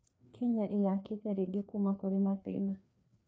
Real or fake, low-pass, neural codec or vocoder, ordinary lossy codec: fake; none; codec, 16 kHz, 2 kbps, FreqCodec, larger model; none